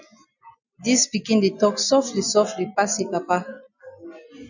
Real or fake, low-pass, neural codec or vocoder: real; 7.2 kHz; none